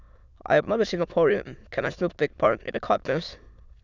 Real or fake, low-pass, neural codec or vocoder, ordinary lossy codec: fake; 7.2 kHz; autoencoder, 22.05 kHz, a latent of 192 numbers a frame, VITS, trained on many speakers; Opus, 64 kbps